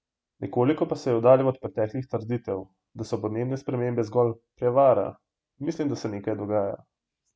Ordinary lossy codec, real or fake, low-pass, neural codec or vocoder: none; real; none; none